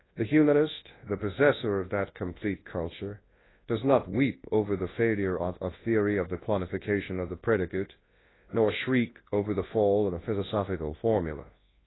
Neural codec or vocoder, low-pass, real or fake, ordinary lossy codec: codec, 24 kHz, 0.9 kbps, WavTokenizer, large speech release; 7.2 kHz; fake; AAC, 16 kbps